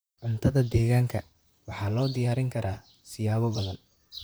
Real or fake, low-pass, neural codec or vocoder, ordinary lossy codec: fake; none; vocoder, 44.1 kHz, 128 mel bands, Pupu-Vocoder; none